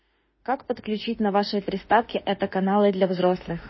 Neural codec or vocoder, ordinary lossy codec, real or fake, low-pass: autoencoder, 48 kHz, 32 numbers a frame, DAC-VAE, trained on Japanese speech; MP3, 24 kbps; fake; 7.2 kHz